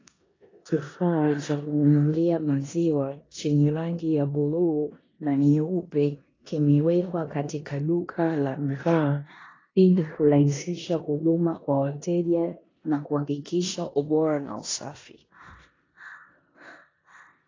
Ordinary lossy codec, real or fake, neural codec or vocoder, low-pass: AAC, 32 kbps; fake; codec, 16 kHz in and 24 kHz out, 0.9 kbps, LongCat-Audio-Codec, four codebook decoder; 7.2 kHz